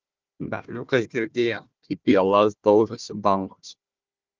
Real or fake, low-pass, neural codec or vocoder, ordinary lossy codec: fake; 7.2 kHz; codec, 16 kHz, 1 kbps, FunCodec, trained on Chinese and English, 50 frames a second; Opus, 32 kbps